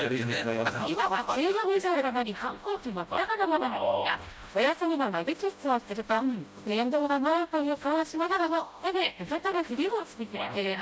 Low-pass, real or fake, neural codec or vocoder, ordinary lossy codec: none; fake; codec, 16 kHz, 0.5 kbps, FreqCodec, smaller model; none